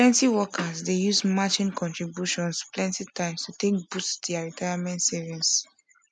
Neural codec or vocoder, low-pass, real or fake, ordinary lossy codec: none; none; real; none